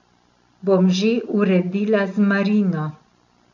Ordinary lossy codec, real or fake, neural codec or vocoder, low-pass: none; real; none; 7.2 kHz